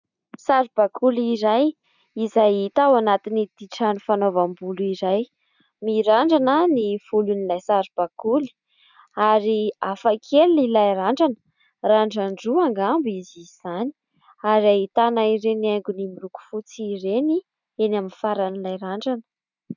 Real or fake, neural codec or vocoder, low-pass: real; none; 7.2 kHz